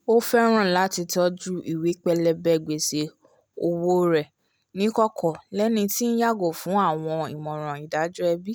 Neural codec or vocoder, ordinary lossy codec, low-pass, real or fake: none; none; none; real